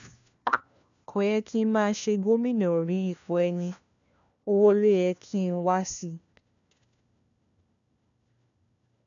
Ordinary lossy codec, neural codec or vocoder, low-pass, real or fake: none; codec, 16 kHz, 1 kbps, FunCodec, trained on LibriTTS, 50 frames a second; 7.2 kHz; fake